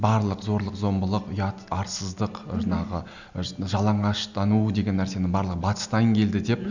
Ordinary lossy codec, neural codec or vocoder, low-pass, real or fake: none; none; 7.2 kHz; real